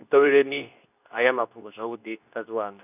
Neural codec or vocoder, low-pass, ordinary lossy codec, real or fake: codec, 24 kHz, 0.9 kbps, WavTokenizer, medium speech release version 2; 3.6 kHz; none; fake